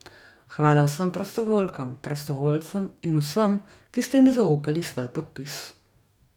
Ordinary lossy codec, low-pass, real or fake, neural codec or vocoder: none; 19.8 kHz; fake; codec, 44.1 kHz, 2.6 kbps, DAC